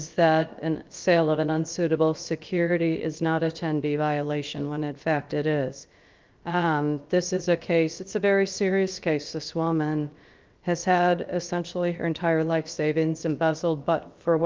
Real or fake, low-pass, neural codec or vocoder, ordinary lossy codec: fake; 7.2 kHz; codec, 16 kHz, about 1 kbps, DyCAST, with the encoder's durations; Opus, 32 kbps